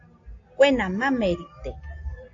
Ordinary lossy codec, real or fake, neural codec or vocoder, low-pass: AAC, 48 kbps; real; none; 7.2 kHz